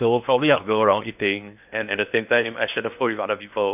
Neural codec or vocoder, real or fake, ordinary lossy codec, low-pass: codec, 16 kHz in and 24 kHz out, 0.8 kbps, FocalCodec, streaming, 65536 codes; fake; none; 3.6 kHz